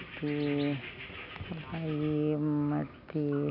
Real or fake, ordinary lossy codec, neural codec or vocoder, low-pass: real; none; none; 5.4 kHz